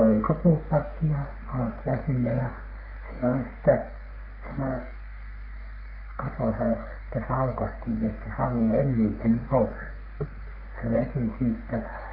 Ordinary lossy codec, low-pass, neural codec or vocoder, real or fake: none; 5.4 kHz; codec, 44.1 kHz, 3.4 kbps, Pupu-Codec; fake